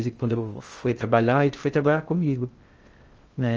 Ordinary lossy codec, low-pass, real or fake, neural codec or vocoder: Opus, 24 kbps; 7.2 kHz; fake; codec, 16 kHz in and 24 kHz out, 0.6 kbps, FocalCodec, streaming, 2048 codes